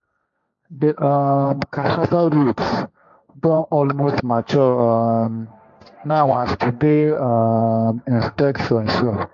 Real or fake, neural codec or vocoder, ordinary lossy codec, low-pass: fake; codec, 16 kHz, 1.1 kbps, Voila-Tokenizer; none; 7.2 kHz